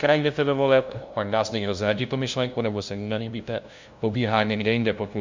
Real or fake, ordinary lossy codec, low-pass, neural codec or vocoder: fake; MP3, 64 kbps; 7.2 kHz; codec, 16 kHz, 0.5 kbps, FunCodec, trained on LibriTTS, 25 frames a second